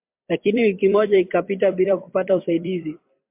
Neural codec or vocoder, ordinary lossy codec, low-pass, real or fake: vocoder, 44.1 kHz, 128 mel bands every 256 samples, BigVGAN v2; MP3, 32 kbps; 3.6 kHz; fake